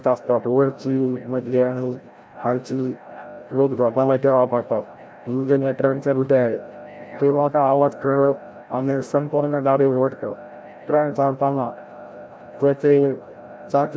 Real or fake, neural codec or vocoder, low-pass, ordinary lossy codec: fake; codec, 16 kHz, 0.5 kbps, FreqCodec, larger model; none; none